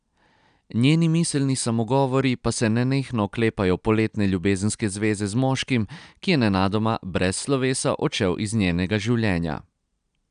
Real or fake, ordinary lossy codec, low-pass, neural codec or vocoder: real; none; 9.9 kHz; none